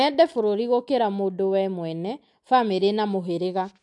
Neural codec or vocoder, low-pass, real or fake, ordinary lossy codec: none; 10.8 kHz; real; MP3, 64 kbps